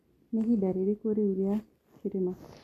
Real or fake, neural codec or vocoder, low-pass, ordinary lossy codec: real; none; 14.4 kHz; none